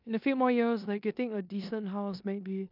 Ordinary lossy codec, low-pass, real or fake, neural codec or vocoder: none; 5.4 kHz; fake; codec, 16 kHz in and 24 kHz out, 0.9 kbps, LongCat-Audio-Codec, four codebook decoder